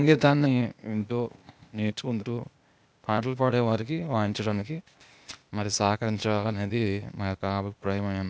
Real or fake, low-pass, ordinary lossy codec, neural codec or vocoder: fake; none; none; codec, 16 kHz, 0.8 kbps, ZipCodec